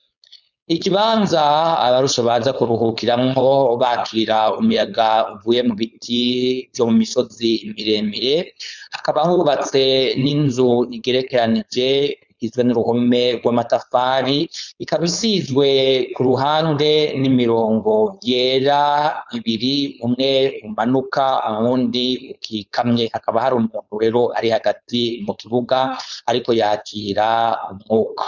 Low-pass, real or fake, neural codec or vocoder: 7.2 kHz; fake; codec, 16 kHz, 4.8 kbps, FACodec